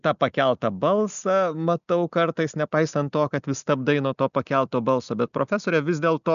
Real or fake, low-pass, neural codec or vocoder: real; 7.2 kHz; none